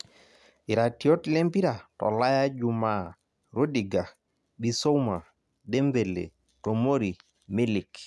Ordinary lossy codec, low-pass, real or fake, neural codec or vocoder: none; none; real; none